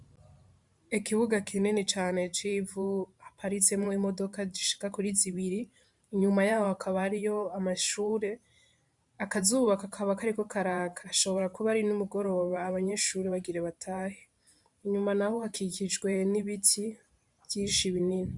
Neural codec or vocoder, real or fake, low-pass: vocoder, 44.1 kHz, 128 mel bands every 512 samples, BigVGAN v2; fake; 10.8 kHz